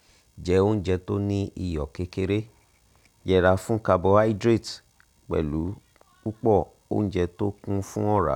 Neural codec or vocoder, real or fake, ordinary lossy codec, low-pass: none; real; none; 19.8 kHz